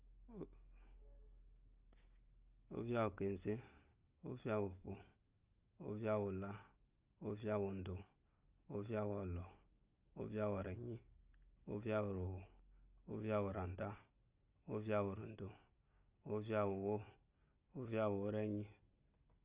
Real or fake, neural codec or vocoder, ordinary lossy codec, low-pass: real; none; Opus, 24 kbps; 3.6 kHz